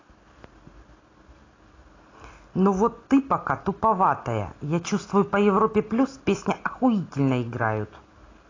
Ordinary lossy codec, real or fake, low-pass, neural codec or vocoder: AAC, 32 kbps; real; 7.2 kHz; none